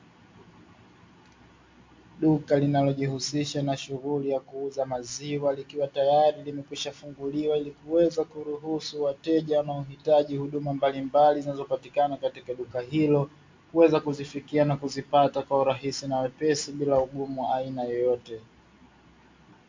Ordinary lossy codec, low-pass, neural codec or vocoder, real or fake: MP3, 48 kbps; 7.2 kHz; none; real